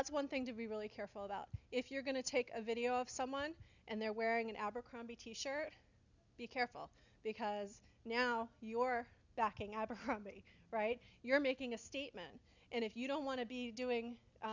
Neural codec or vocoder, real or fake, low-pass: none; real; 7.2 kHz